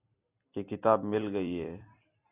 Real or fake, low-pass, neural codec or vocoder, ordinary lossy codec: fake; 3.6 kHz; vocoder, 44.1 kHz, 128 mel bands every 256 samples, BigVGAN v2; AAC, 32 kbps